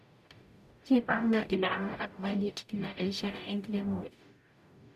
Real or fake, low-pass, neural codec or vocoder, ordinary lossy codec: fake; 14.4 kHz; codec, 44.1 kHz, 0.9 kbps, DAC; none